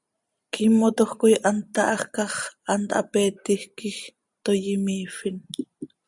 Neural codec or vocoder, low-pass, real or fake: none; 10.8 kHz; real